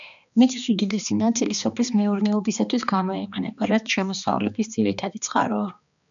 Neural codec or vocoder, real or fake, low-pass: codec, 16 kHz, 2 kbps, X-Codec, HuBERT features, trained on balanced general audio; fake; 7.2 kHz